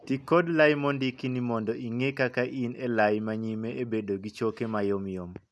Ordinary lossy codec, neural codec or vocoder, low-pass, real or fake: none; none; none; real